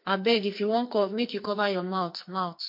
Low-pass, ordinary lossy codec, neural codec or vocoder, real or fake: 5.4 kHz; MP3, 32 kbps; codec, 44.1 kHz, 2.6 kbps, SNAC; fake